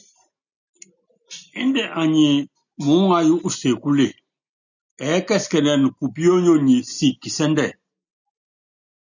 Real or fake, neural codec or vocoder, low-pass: real; none; 7.2 kHz